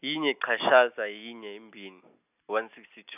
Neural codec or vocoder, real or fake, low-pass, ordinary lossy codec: none; real; 3.6 kHz; none